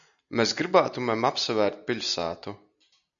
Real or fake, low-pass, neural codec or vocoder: real; 7.2 kHz; none